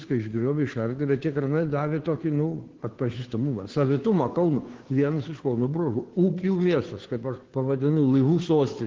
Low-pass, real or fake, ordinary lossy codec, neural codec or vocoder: 7.2 kHz; fake; Opus, 16 kbps; codec, 16 kHz, 2 kbps, FunCodec, trained on Chinese and English, 25 frames a second